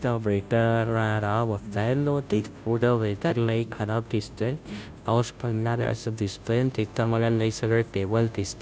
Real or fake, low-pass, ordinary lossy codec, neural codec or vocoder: fake; none; none; codec, 16 kHz, 0.5 kbps, FunCodec, trained on Chinese and English, 25 frames a second